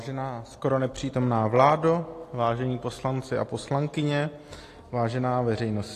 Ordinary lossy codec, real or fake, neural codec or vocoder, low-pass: AAC, 48 kbps; real; none; 14.4 kHz